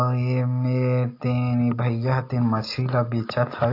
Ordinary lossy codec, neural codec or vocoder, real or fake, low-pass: AAC, 32 kbps; none; real; 5.4 kHz